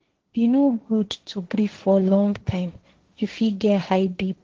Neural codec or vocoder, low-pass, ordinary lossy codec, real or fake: codec, 16 kHz, 1.1 kbps, Voila-Tokenizer; 7.2 kHz; Opus, 16 kbps; fake